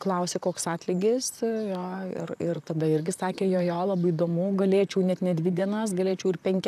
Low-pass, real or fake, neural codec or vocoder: 14.4 kHz; fake; vocoder, 44.1 kHz, 128 mel bands, Pupu-Vocoder